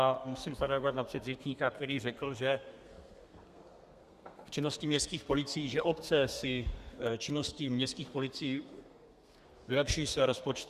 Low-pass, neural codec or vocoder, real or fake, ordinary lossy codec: 14.4 kHz; codec, 32 kHz, 1.9 kbps, SNAC; fake; Opus, 64 kbps